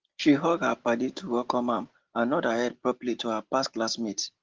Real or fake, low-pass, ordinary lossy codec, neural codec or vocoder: real; 7.2 kHz; Opus, 16 kbps; none